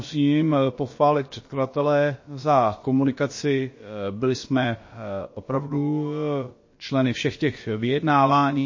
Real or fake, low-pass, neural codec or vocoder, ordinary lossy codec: fake; 7.2 kHz; codec, 16 kHz, about 1 kbps, DyCAST, with the encoder's durations; MP3, 32 kbps